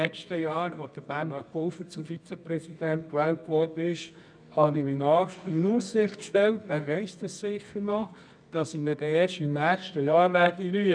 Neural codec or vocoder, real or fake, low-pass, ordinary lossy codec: codec, 24 kHz, 0.9 kbps, WavTokenizer, medium music audio release; fake; 9.9 kHz; none